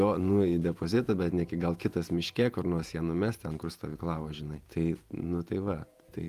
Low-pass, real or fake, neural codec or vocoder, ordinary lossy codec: 14.4 kHz; fake; vocoder, 48 kHz, 128 mel bands, Vocos; Opus, 32 kbps